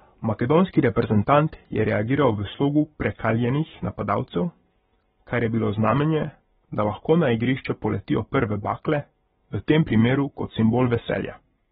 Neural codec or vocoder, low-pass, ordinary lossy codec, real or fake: codec, 44.1 kHz, 7.8 kbps, Pupu-Codec; 19.8 kHz; AAC, 16 kbps; fake